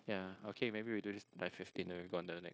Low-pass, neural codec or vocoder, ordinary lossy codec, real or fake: none; codec, 16 kHz, 0.9 kbps, LongCat-Audio-Codec; none; fake